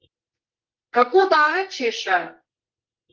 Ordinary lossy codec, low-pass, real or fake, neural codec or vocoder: Opus, 16 kbps; 7.2 kHz; fake; codec, 24 kHz, 0.9 kbps, WavTokenizer, medium music audio release